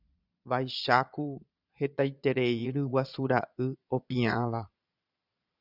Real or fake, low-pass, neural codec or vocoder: fake; 5.4 kHz; vocoder, 22.05 kHz, 80 mel bands, Vocos